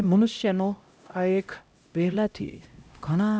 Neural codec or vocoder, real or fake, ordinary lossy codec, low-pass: codec, 16 kHz, 0.5 kbps, X-Codec, HuBERT features, trained on LibriSpeech; fake; none; none